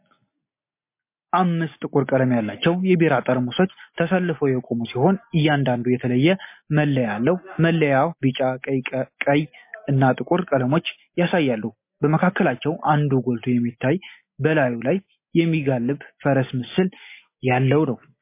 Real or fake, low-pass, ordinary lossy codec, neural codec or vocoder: real; 3.6 kHz; MP3, 24 kbps; none